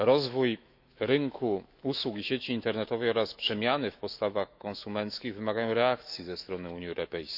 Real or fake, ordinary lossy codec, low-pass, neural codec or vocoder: fake; none; 5.4 kHz; autoencoder, 48 kHz, 128 numbers a frame, DAC-VAE, trained on Japanese speech